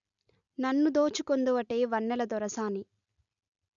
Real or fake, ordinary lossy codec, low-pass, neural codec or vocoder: real; none; 7.2 kHz; none